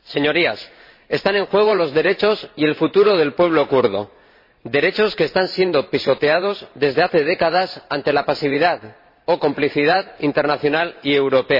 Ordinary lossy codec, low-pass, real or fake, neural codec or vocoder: MP3, 24 kbps; 5.4 kHz; fake; vocoder, 44.1 kHz, 128 mel bands every 512 samples, BigVGAN v2